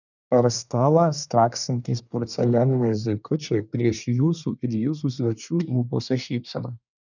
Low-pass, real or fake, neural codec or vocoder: 7.2 kHz; fake; codec, 24 kHz, 1 kbps, SNAC